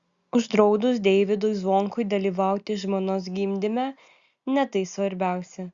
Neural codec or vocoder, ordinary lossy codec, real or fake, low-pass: none; Opus, 64 kbps; real; 7.2 kHz